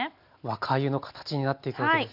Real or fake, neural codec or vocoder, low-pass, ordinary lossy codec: real; none; 5.4 kHz; none